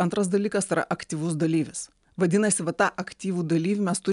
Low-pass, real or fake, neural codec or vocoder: 10.8 kHz; real; none